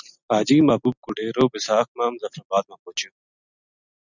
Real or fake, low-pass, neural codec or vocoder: real; 7.2 kHz; none